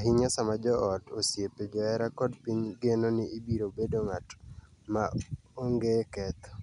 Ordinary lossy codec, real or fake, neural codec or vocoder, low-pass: none; real; none; 10.8 kHz